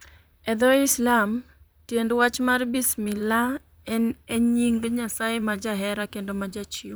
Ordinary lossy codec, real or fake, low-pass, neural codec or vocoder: none; fake; none; vocoder, 44.1 kHz, 128 mel bands, Pupu-Vocoder